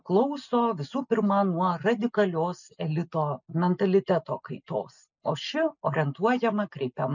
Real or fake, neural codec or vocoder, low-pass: real; none; 7.2 kHz